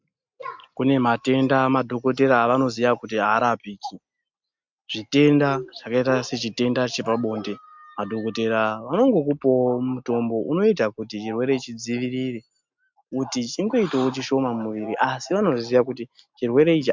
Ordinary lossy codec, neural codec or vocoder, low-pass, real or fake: MP3, 64 kbps; none; 7.2 kHz; real